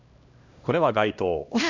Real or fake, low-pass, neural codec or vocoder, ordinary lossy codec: fake; 7.2 kHz; codec, 16 kHz, 1 kbps, X-Codec, HuBERT features, trained on LibriSpeech; Opus, 64 kbps